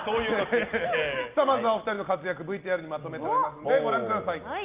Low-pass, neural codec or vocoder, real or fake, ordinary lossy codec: 3.6 kHz; none; real; Opus, 32 kbps